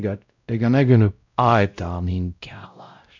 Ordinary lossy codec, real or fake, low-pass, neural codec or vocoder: none; fake; 7.2 kHz; codec, 16 kHz, 0.5 kbps, X-Codec, WavLM features, trained on Multilingual LibriSpeech